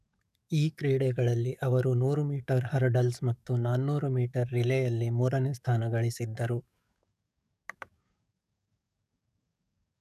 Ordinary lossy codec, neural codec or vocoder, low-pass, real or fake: none; codec, 44.1 kHz, 7.8 kbps, DAC; 14.4 kHz; fake